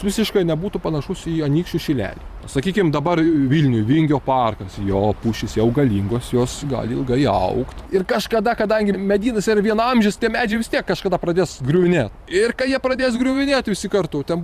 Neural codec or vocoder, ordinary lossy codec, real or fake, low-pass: vocoder, 44.1 kHz, 128 mel bands every 512 samples, BigVGAN v2; Opus, 64 kbps; fake; 14.4 kHz